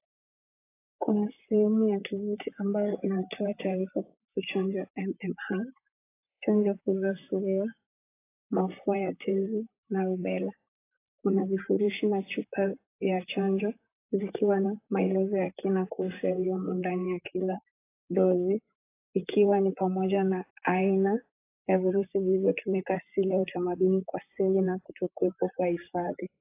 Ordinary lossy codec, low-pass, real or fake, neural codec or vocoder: AAC, 24 kbps; 3.6 kHz; fake; vocoder, 44.1 kHz, 128 mel bands, Pupu-Vocoder